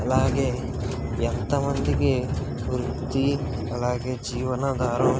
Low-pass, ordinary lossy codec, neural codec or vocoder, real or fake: none; none; none; real